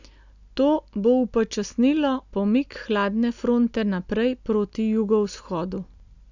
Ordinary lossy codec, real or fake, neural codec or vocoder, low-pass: none; real; none; 7.2 kHz